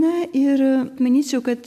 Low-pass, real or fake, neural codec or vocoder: 14.4 kHz; real; none